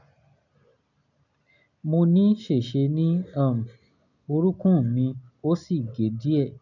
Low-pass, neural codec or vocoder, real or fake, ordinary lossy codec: 7.2 kHz; none; real; none